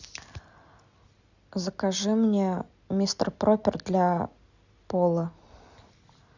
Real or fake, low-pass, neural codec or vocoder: real; 7.2 kHz; none